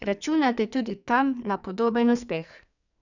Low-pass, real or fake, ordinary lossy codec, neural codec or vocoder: 7.2 kHz; fake; none; codec, 44.1 kHz, 2.6 kbps, SNAC